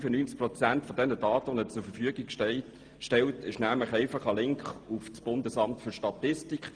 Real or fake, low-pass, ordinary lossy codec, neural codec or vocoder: real; 9.9 kHz; Opus, 24 kbps; none